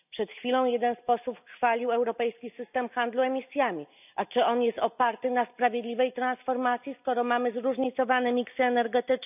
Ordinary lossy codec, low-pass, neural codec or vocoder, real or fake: none; 3.6 kHz; none; real